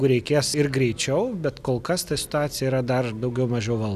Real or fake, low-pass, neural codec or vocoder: real; 14.4 kHz; none